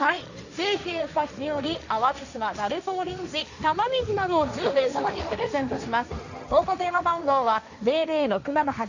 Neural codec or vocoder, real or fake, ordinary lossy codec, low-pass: codec, 16 kHz, 1.1 kbps, Voila-Tokenizer; fake; none; 7.2 kHz